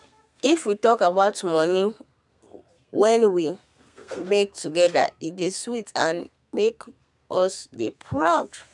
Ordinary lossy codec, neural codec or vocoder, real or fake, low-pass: none; codec, 32 kHz, 1.9 kbps, SNAC; fake; 10.8 kHz